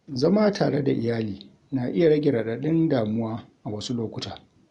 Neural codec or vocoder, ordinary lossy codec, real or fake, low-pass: none; Opus, 64 kbps; real; 10.8 kHz